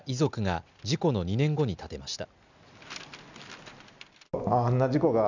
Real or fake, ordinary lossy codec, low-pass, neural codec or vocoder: real; none; 7.2 kHz; none